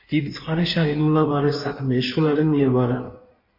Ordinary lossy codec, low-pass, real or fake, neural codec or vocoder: MP3, 32 kbps; 5.4 kHz; fake; codec, 16 kHz in and 24 kHz out, 1.1 kbps, FireRedTTS-2 codec